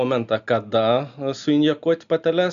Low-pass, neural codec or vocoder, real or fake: 7.2 kHz; none; real